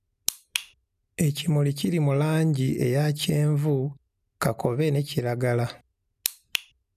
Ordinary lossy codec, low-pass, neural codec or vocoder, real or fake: none; 14.4 kHz; none; real